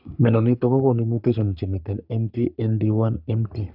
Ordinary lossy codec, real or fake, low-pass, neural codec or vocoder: AAC, 48 kbps; fake; 5.4 kHz; codec, 44.1 kHz, 3.4 kbps, Pupu-Codec